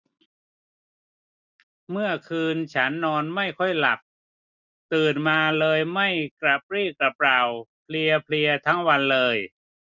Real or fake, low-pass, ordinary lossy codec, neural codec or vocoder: real; 7.2 kHz; none; none